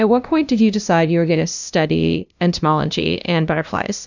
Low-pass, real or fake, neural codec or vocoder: 7.2 kHz; fake; codec, 16 kHz, 0.5 kbps, FunCodec, trained on LibriTTS, 25 frames a second